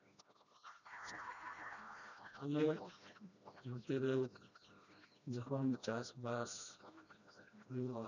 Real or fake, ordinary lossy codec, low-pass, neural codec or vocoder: fake; none; 7.2 kHz; codec, 16 kHz, 1 kbps, FreqCodec, smaller model